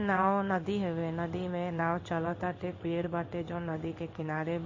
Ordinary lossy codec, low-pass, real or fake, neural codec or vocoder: MP3, 32 kbps; 7.2 kHz; fake; codec, 16 kHz in and 24 kHz out, 1 kbps, XY-Tokenizer